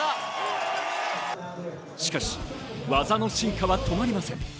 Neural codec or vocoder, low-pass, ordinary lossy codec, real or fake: none; none; none; real